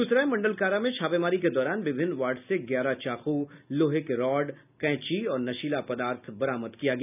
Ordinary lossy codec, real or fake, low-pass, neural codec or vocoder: none; real; 3.6 kHz; none